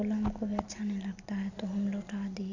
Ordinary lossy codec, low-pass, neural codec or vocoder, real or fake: none; 7.2 kHz; none; real